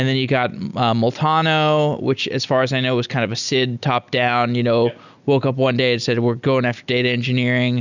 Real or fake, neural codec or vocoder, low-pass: real; none; 7.2 kHz